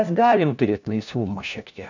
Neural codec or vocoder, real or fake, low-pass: codec, 16 kHz, 1 kbps, FunCodec, trained on LibriTTS, 50 frames a second; fake; 7.2 kHz